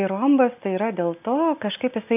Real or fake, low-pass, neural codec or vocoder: real; 3.6 kHz; none